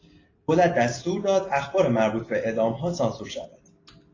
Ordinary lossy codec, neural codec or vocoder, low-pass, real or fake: AAC, 32 kbps; none; 7.2 kHz; real